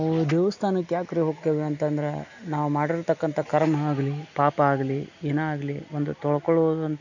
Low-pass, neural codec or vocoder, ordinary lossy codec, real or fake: 7.2 kHz; none; none; real